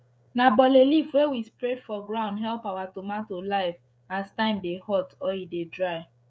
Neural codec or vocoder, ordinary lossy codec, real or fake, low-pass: codec, 16 kHz, 16 kbps, FreqCodec, smaller model; none; fake; none